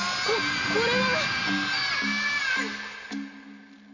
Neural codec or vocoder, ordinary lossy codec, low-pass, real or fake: none; none; 7.2 kHz; real